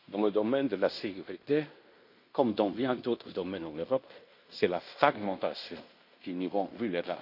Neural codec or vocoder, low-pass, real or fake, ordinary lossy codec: codec, 16 kHz in and 24 kHz out, 0.9 kbps, LongCat-Audio-Codec, fine tuned four codebook decoder; 5.4 kHz; fake; MP3, 32 kbps